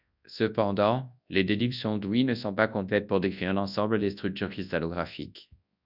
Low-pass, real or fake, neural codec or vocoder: 5.4 kHz; fake; codec, 24 kHz, 0.9 kbps, WavTokenizer, large speech release